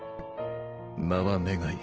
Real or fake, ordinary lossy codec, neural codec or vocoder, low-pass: real; Opus, 16 kbps; none; 7.2 kHz